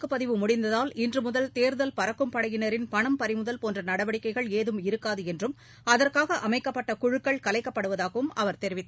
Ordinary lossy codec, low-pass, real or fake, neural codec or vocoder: none; none; real; none